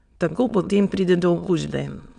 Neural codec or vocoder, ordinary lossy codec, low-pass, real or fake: autoencoder, 22.05 kHz, a latent of 192 numbers a frame, VITS, trained on many speakers; none; 9.9 kHz; fake